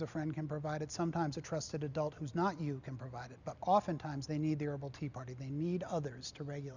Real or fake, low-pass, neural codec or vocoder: real; 7.2 kHz; none